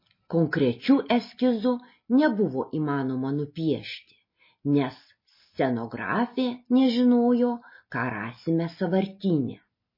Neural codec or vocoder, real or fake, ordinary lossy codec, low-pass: none; real; MP3, 24 kbps; 5.4 kHz